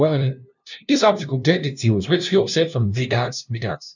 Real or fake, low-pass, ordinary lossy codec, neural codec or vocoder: fake; 7.2 kHz; none; codec, 16 kHz, 0.5 kbps, FunCodec, trained on LibriTTS, 25 frames a second